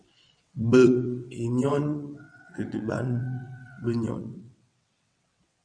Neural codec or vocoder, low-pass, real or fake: vocoder, 22.05 kHz, 80 mel bands, WaveNeXt; 9.9 kHz; fake